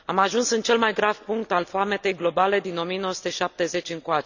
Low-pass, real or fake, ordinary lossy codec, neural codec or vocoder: 7.2 kHz; real; none; none